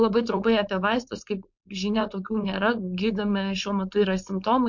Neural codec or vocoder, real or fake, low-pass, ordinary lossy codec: codec, 16 kHz, 4.8 kbps, FACodec; fake; 7.2 kHz; MP3, 48 kbps